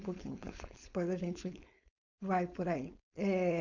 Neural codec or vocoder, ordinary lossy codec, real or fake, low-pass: codec, 16 kHz, 4.8 kbps, FACodec; none; fake; 7.2 kHz